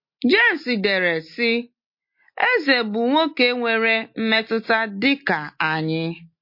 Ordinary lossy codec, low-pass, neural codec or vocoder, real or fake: MP3, 32 kbps; 5.4 kHz; none; real